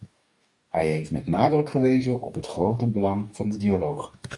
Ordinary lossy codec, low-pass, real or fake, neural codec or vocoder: MP3, 96 kbps; 10.8 kHz; fake; codec, 44.1 kHz, 2.6 kbps, DAC